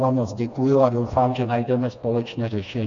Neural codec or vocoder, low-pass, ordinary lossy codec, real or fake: codec, 16 kHz, 2 kbps, FreqCodec, smaller model; 7.2 kHz; MP3, 64 kbps; fake